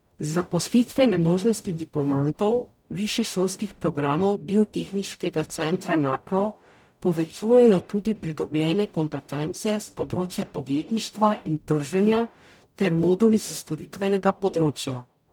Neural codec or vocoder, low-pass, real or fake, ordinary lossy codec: codec, 44.1 kHz, 0.9 kbps, DAC; 19.8 kHz; fake; none